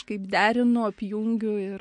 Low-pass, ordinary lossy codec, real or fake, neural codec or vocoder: 10.8 kHz; MP3, 48 kbps; real; none